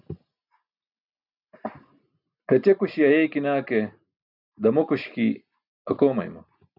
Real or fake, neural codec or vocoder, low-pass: real; none; 5.4 kHz